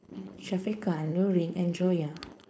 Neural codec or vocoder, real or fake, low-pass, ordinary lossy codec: codec, 16 kHz, 4.8 kbps, FACodec; fake; none; none